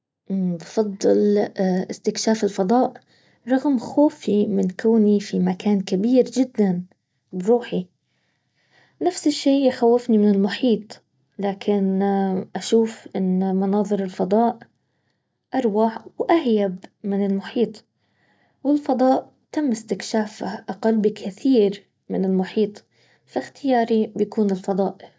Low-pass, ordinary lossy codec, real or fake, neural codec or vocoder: none; none; real; none